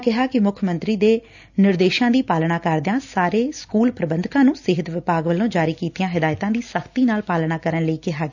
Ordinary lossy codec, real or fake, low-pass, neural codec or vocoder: none; real; 7.2 kHz; none